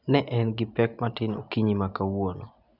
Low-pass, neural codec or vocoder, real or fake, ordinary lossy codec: 5.4 kHz; none; real; none